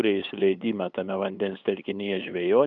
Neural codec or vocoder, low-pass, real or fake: codec, 16 kHz, 8 kbps, FunCodec, trained on LibriTTS, 25 frames a second; 7.2 kHz; fake